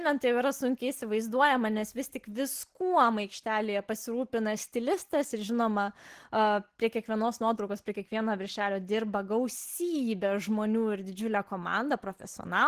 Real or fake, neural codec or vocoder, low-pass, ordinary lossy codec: real; none; 14.4 kHz; Opus, 16 kbps